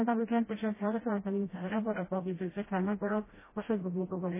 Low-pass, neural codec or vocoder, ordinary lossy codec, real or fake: 3.6 kHz; codec, 16 kHz, 0.5 kbps, FreqCodec, smaller model; MP3, 16 kbps; fake